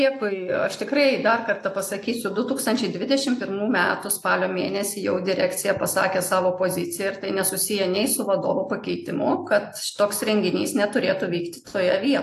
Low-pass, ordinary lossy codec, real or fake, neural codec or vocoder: 14.4 kHz; AAC, 64 kbps; real; none